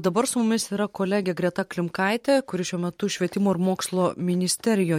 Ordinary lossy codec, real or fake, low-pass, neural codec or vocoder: MP3, 64 kbps; fake; 19.8 kHz; vocoder, 44.1 kHz, 128 mel bands every 512 samples, BigVGAN v2